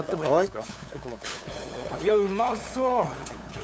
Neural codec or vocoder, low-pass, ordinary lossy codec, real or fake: codec, 16 kHz, 8 kbps, FunCodec, trained on LibriTTS, 25 frames a second; none; none; fake